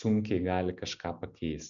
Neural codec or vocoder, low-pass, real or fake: none; 7.2 kHz; real